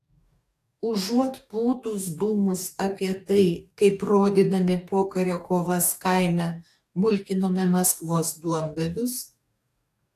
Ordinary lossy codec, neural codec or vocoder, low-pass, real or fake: AAC, 64 kbps; codec, 44.1 kHz, 2.6 kbps, DAC; 14.4 kHz; fake